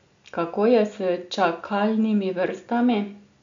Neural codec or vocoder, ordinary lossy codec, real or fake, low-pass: none; MP3, 64 kbps; real; 7.2 kHz